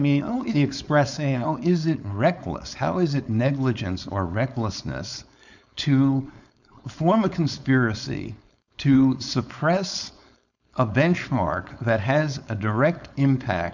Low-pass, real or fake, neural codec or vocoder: 7.2 kHz; fake; codec, 16 kHz, 4.8 kbps, FACodec